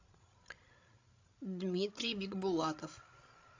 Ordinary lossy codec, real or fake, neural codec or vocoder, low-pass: AAC, 48 kbps; fake; codec, 16 kHz, 16 kbps, FreqCodec, larger model; 7.2 kHz